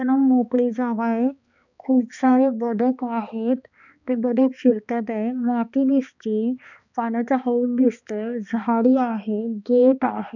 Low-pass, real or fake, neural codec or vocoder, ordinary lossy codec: 7.2 kHz; fake; codec, 16 kHz, 2 kbps, X-Codec, HuBERT features, trained on balanced general audio; none